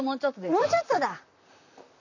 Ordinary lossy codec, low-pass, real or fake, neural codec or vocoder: none; 7.2 kHz; fake; vocoder, 44.1 kHz, 128 mel bands, Pupu-Vocoder